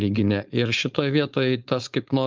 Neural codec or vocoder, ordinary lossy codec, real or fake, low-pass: codec, 16 kHz, 4.8 kbps, FACodec; Opus, 24 kbps; fake; 7.2 kHz